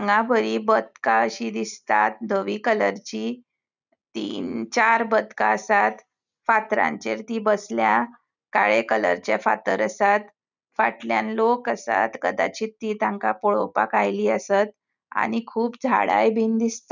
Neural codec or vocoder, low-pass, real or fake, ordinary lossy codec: none; 7.2 kHz; real; none